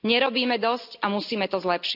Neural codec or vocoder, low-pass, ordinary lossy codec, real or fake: none; 5.4 kHz; none; real